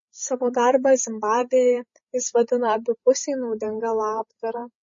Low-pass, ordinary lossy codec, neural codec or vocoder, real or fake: 7.2 kHz; MP3, 32 kbps; codec, 16 kHz, 16 kbps, FreqCodec, larger model; fake